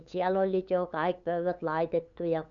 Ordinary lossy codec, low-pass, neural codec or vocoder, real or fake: none; 7.2 kHz; codec, 16 kHz, 8 kbps, FunCodec, trained on LibriTTS, 25 frames a second; fake